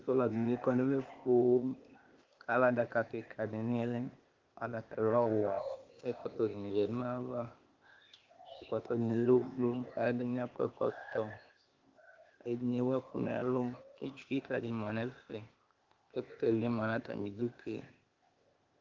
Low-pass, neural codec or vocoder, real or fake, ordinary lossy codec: 7.2 kHz; codec, 16 kHz, 0.8 kbps, ZipCodec; fake; Opus, 32 kbps